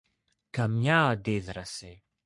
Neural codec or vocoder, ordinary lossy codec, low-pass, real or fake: codec, 44.1 kHz, 3.4 kbps, Pupu-Codec; MP3, 64 kbps; 10.8 kHz; fake